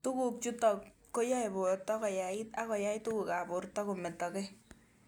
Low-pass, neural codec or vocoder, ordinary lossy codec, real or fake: none; none; none; real